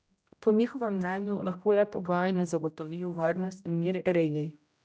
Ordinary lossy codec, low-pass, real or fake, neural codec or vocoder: none; none; fake; codec, 16 kHz, 0.5 kbps, X-Codec, HuBERT features, trained on general audio